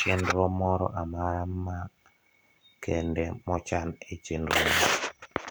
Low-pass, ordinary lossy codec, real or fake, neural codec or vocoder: none; none; fake; vocoder, 44.1 kHz, 128 mel bands every 256 samples, BigVGAN v2